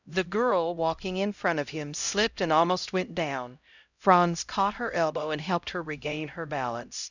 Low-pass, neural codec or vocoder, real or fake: 7.2 kHz; codec, 16 kHz, 0.5 kbps, X-Codec, HuBERT features, trained on LibriSpeech; fake